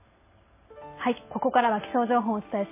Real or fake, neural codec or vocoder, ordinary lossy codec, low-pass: real; none; MP3, 16 kbps; 3.6 kHz